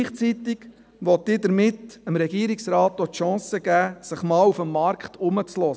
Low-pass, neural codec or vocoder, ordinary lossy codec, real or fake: none; none; none; real